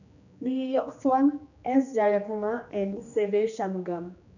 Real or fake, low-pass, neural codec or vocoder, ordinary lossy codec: fake; 7.2 kHz; codec, 16 kHz, 2 kbps, X-Codec, HuBERT features, trained on general audio; none